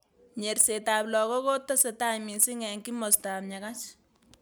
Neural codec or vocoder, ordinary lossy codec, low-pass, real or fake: none; none; none; real